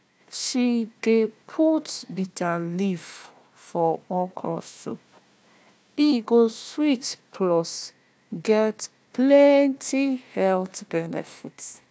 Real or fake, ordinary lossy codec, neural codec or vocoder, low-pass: fake; none; codec, 16 kHz, 1 kbps, FunCodec, trained on Chinese and English, 50 frames a second; none